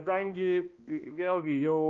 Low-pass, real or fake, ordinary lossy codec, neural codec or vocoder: 7.2 kHz; fake; Opus, 32 kbps; codec, 16 kHz, 1 kbps, X-Codec, HuBERT features, trained on balanced general audio